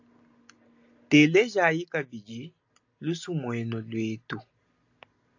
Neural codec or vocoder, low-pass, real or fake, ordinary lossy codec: none; 7.2 kHz; real; AAC, 48 kbps